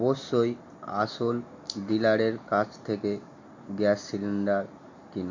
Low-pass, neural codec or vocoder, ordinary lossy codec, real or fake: 7.2 kHz; none; MP3, 48 kbps; real